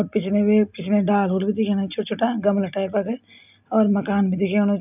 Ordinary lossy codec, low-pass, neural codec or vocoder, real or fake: none; 3.6 kHz; none; real